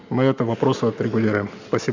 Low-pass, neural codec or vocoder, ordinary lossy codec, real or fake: 7.2 kHz; none; Opus, 64 kbps; real